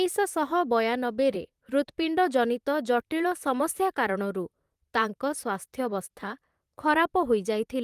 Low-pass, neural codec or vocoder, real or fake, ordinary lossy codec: 14.4 kHz; vocoder, 44.1 kHz, 128 mel bands, Pupu-Vocoder; fake; Opus, 32 kbps